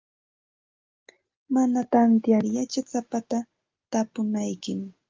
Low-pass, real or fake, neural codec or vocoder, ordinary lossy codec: 7.2 kHz; real; none; Opus, 24 kbps